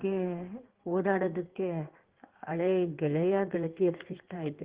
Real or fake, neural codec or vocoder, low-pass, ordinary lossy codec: fake; codec, 16 kHz in and 24 kHz out, 1.1 kbps, FireRedTTS-2 codec; 3.6 kHz; Opus, 16 kbps